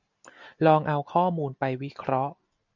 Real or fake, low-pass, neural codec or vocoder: real; 7.2 kHz; none